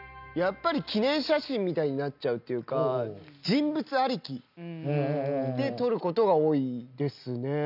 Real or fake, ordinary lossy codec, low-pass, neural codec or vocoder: real; none; 5.4 kHz; none